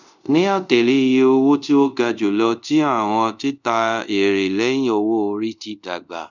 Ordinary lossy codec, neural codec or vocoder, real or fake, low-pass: none; codec, 24 kHz, 0.5 kbps, DualCodec; fake; 7.2 kHz